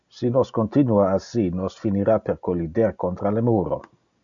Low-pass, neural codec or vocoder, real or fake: 7.2 kHz; none; real